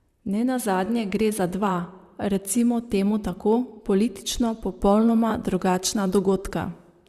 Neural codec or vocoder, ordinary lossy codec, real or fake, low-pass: vocoder, 44.1 kHz, 128 mel bands, Pupu-Vocoder; Opus, 64 kbps; fake; 14.4 kHz